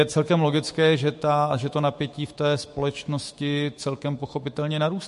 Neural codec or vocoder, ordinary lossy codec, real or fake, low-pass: autoencoder, 48 kHz, 128 numbers a frame, DAC-VAE, trained on Japanese speech; MP3, 48 kbps; fake; 14.4 kHz